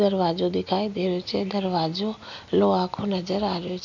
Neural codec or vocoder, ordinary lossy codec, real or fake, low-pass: none; none; real; 7.2 kHz